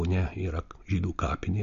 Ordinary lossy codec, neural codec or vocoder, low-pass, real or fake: MP3, 48 kbps; none; 7.2 kHz; real